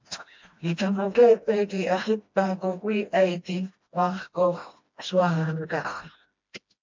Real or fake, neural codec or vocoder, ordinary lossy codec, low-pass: fake; codec, 16 kHz, 1 kbps, FreqCodec, smaller model; MP3, 48 kbps; 7.2 kHz